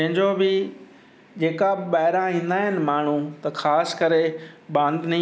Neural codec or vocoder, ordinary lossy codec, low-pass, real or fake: none; none; none; real